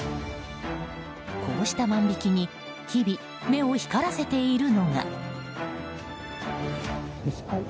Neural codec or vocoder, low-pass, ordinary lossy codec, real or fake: none; none; none; real